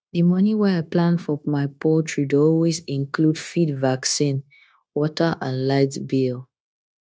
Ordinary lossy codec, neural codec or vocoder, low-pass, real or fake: none; codec, 16 kHz, 0.9 kbps, LongCat-Audio-Codec; none; fake